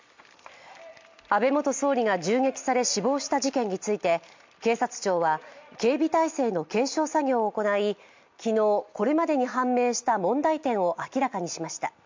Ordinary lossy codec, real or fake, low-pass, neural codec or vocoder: MP3, 64 kbps; real; 7.2 kHz; none